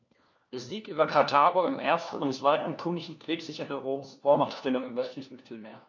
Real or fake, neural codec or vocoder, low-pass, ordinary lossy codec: fake; codec, 16 kHz, 1 kbps, FunCodec, trained on LibriTTS, 50 frames a second; 7.2 kHz; none